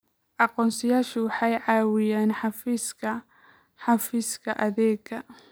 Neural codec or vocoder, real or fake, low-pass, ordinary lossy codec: none; real; none; none